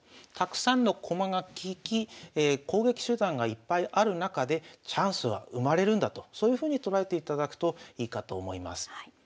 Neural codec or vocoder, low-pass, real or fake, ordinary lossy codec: none; none; real; none